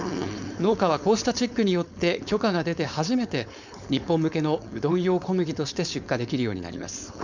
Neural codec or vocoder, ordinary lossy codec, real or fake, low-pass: codec, 16 kHz, 4.8 kbps, FACodec; none; fake; 7.2 kHz